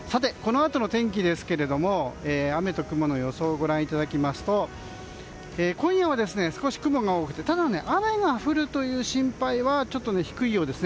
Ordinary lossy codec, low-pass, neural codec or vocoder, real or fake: none; none; none; real